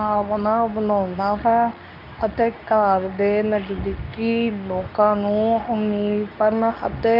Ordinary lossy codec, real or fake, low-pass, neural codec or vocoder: none; fake; 5.4 kHz; codec, 24 kHz, 0.9 kbps, WavTokenizer, medium speech release version 2